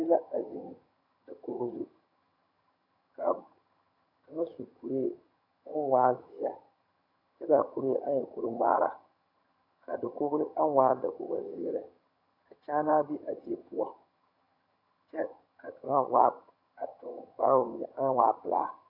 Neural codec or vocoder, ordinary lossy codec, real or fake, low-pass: vocoder, 22.05 kHz, 80 mel bands, HiFi-GAN; AAC, 48 kbps; fake; 5.4 kHz